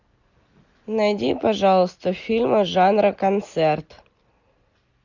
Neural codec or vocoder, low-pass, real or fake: none; 7.2 kHz; real